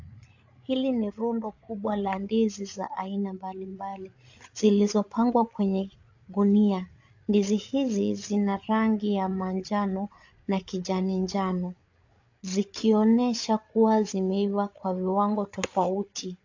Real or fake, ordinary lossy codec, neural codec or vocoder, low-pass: fake; AAC, 48 kbps; codec, 16 kHz, 8 kbps, FreqCodec, larger model; 7.2 kHz